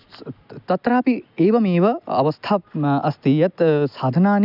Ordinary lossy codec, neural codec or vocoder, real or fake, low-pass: none; none; real; 5.4 kHz